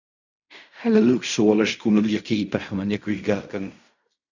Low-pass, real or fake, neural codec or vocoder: 7.2 kHz; fake; codec, 16 kHz in and 24 kHz out, 0.4 kbps, LongCat-Audio-Codec, fine tuned four codebook decoder